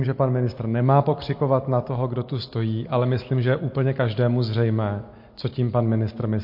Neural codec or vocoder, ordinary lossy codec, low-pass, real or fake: none; MP3, 32 kbps; 5.4 kHz; real